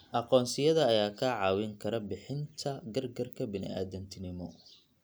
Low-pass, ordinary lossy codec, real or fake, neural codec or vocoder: none; none; real; none